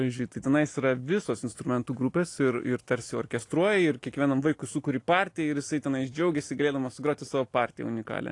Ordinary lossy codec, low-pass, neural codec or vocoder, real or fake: AAC, 48 kbps; 10.8 kHz; none; real